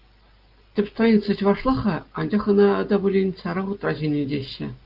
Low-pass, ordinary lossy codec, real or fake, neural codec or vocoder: 5.4 kHz; Opus, 32 kbps; real; none